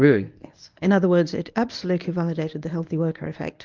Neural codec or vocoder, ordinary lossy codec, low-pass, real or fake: codec, 24 kHz, 0.9 kbps, WavTokenizer, medium speech release version 1; Opus, 24 kbps; 7.2 kHz; fake